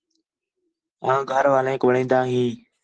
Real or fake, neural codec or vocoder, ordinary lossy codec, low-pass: real; none; Opus, 16 kbps; 9.9 kHz